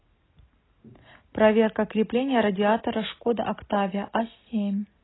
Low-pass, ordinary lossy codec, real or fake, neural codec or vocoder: 7.2 kHz; AAC, 16 kbps; real; none